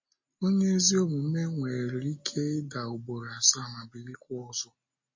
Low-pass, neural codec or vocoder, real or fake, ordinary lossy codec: 7.2 kHz; none; real; MP3, 32 kbps